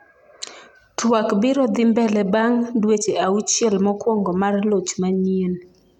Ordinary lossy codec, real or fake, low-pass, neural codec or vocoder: none; real; 19.8 kHz; none